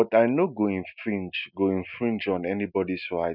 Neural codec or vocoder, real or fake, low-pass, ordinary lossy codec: none; real; 5.4 kHz; none